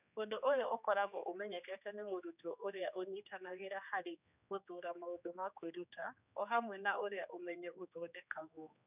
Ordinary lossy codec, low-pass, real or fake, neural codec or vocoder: none; 3.6 kHz; fake; codec, 16 kHz, 2 kbps, X-Codec, HuBERT features, trained on general audio